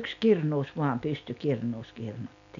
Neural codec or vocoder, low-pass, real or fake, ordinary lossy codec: none; 7.2 kHz; real; none